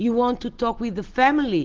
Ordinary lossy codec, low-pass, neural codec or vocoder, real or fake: Opus, 16 kbps; 7.2 kHz; none; real